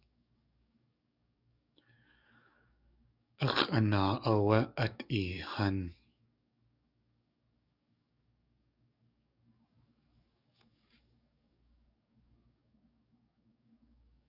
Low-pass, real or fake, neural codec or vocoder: 5.4 kHz; fake; codec, 44.1 kHz, 7.8 kbps, DAC